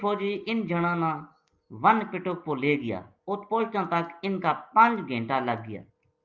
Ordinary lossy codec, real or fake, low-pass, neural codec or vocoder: Opus, 24 kbps; real; 7.2 kHz; none